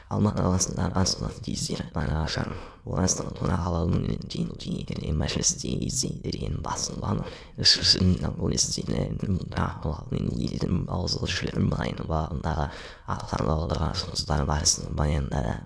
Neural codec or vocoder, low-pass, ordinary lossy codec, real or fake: autoencoder, 22.05 kHz, a latent of 192 numbers a frame, VITS, trained on many speakers; none; none; fake